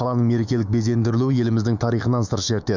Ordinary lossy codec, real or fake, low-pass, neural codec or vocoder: none; fake; 7.2 kHz; autoencoder, 48 kHz, 128 numbers a frame, DAC-VAE, trained on Japanese speech